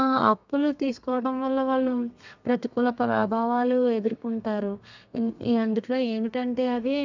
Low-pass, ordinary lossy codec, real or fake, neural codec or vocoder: 7.2 kHz; none; fake; codec, 32 kHz, 1.9 kbps, SNAC